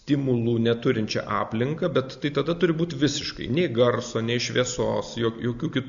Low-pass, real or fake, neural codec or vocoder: 7.2 kHz; real; none